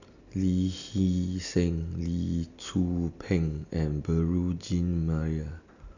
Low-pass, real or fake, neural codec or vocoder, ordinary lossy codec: 7.2 kHz; real; none; none